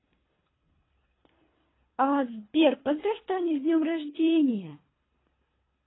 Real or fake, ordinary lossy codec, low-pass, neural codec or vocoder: fake; AAC, 16 kbps; 7.2 kHz; codec, 24 kHz, 3 kbps, HILCodec